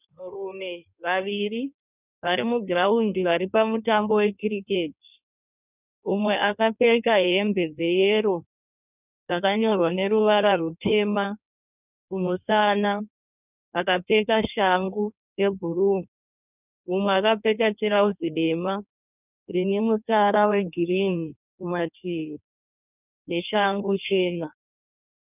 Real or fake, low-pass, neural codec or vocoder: fake; 3.6 kHz; codec, 16 kHz in and 24 kHz out, 1.1 kbps, FireRedTTS-2 codec